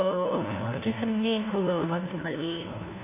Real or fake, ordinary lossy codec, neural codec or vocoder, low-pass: fake; AAC, 32 kbps; codec, 16 kHz, 1 kbps, FunCodec, trained on LibriTTS, 50 frames a second; 3.6 kHz